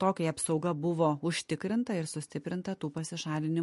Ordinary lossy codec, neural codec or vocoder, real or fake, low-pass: MP3, 48 kbps; none; real; 14.4 kHz